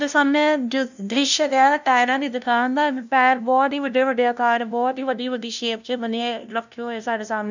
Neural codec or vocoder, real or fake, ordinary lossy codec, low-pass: codec, 16 kHz, 0.5 kbps, FunCodec, trained on LibriTTS, 25 frames a second; fake; none; 7.2 kHz